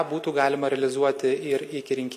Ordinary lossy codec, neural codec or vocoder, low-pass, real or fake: MP3, 64 kbps; none; 14.4 kHz; real